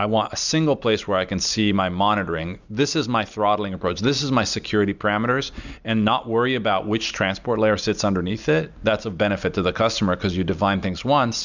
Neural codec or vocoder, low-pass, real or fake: none; 7.2 kHz; real